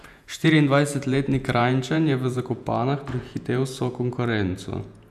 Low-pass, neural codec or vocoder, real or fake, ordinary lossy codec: 14.4 kHz; vocoder, 48 kHz, 128 mel bands, Vocos; fake; none